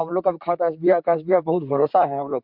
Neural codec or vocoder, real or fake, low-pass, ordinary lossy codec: vocoder, 44.1 kHz, 128 mel bands, Pupu-Vocoder; fake; 5.4 kHz; MP3, 48 kbps